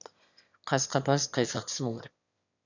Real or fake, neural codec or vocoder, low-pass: fake; autoencoder, 22.05 kHz, a latent of 192 numbers a frame, VITS, trained on one speaker; 7.2 kHz